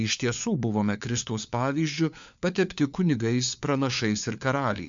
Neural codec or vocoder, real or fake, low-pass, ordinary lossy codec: codec, 16 kHz, 4 kbps, FunCodec, trained on LibriTTS, 50 frames a second; fake; 7.2 kHz; MP3, 64 kbps